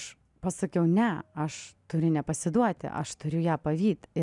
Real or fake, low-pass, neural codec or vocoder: real; 10.8 kHz; none